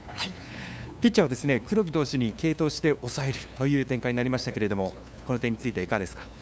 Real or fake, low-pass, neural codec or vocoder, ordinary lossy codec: fake; none; codec, 16 kHz, 2 kbps, FunCodec, trained on LibriTTS, 25 frames a second; none